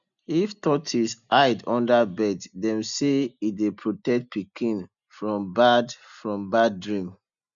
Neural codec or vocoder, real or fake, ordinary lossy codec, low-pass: none; real; none; 7.2 kHz